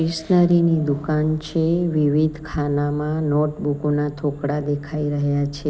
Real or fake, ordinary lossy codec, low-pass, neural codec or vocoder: real; none; none; none